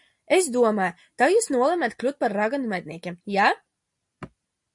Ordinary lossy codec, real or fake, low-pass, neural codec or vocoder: MP3, 48 kbps; real; 10.8 kHz; none